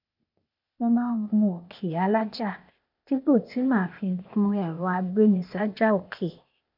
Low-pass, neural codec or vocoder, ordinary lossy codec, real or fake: 5.4 kHz; codec, 16 kHz, 0.8 kbps, ZipCodec; none; fake